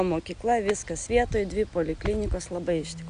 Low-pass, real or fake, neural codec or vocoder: 9.9 kHz; real; none